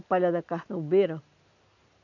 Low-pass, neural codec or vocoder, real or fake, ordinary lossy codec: 7.2 kHz; none; real; none